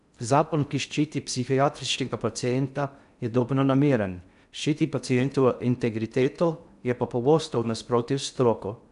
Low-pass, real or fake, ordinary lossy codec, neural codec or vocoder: 10.8 kHz; fake; none; codec, 16 kHz in and 24 kHz out, 0.6 kbps, FocalCodec, streaming, 4096 codes